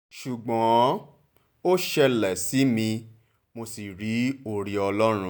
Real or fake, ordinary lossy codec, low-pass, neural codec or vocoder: real; none; none; none